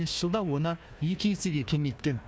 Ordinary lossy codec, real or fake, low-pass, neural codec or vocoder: none; fake; none; codec, 16 kHz, 1 kbps, FunCodec, trained on Chinese and English, 50 frames a second